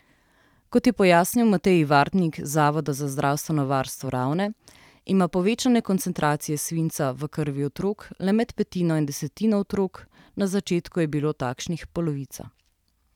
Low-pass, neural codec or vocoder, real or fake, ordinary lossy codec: 19.8 kHz; vocoder, 44.1 kHz, 128 mel bands every 512 samples, BigVGAN v2; fake; none